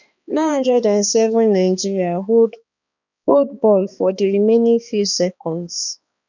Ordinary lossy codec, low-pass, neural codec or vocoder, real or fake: none; 7.2 kHz; codec, 16 kHz, 2 kbps, X-Codec, HuBERT features, trained on balanced general audio; fake